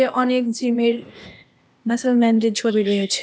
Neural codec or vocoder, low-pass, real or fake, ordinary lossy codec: codec, 16 kHz, 0.8 kbps, ZipCodec; none; fake; none